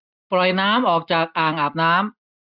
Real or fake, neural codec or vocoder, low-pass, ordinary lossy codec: real; none; 5.4 kHz; none